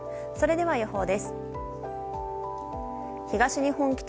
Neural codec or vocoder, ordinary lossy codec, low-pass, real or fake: none; none; none; real